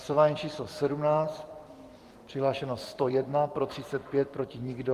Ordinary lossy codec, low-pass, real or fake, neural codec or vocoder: Opus, 24 kbps; 14.4 kHz; fake; vocoder, 44.1 kHz, 128 mel bands every 256 samples, BigVGAN v2